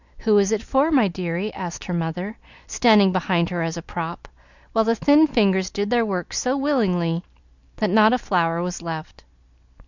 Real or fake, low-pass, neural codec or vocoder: real; 7.2 kHz; none